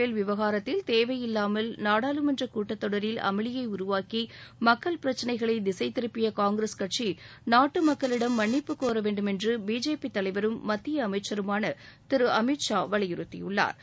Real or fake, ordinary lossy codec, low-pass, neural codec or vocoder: real; none; none; none